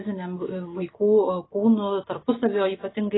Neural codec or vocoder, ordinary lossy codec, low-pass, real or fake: none; AAC, 16 kbps; 7.2 kHz; real